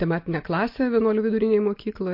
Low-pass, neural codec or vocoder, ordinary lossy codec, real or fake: 5.4 kHz; none; MP3, 32 kbps; real